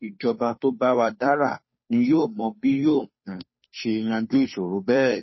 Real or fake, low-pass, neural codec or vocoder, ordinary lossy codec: fake; 7.2 kHz; codec, 16 kHz, 2 kbps, FunCodec, trained on Chinese and English, 25 frames a second; MP3, 24 kbps